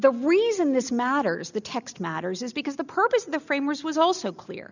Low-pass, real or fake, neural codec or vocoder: 7.2 kHz; real; none